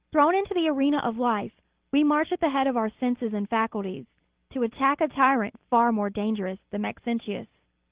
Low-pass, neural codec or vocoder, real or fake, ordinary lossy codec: 3.6 kHz; none; real; Opus, 16 kbps